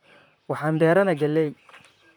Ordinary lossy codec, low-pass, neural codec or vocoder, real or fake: none; 19.8 kHz; vocoder, 44.1 kHz, 128 mel bands, Pupu-Vocoder; fake